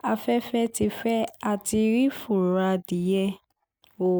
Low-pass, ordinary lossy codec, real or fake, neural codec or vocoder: none; none; real; none